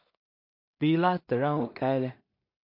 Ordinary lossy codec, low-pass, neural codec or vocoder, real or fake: MP3, 32 kbps; 5.4 kHz; codec, 16 kHz in and 24 kHz out, 0.4 kbps, LongCat-Audio-Codec, two codebook decoder; fake